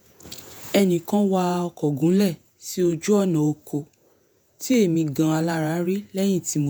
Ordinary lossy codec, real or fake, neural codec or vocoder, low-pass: none; fake; vocoder, 48 kHz, 128 mel bands, Vocos; none